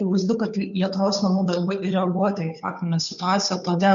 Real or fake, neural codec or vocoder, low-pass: fake; codec, 16 kHz, 2 kbps, FunCodec, trained on Chinese and English, 25 frames a second; 7.2 kHz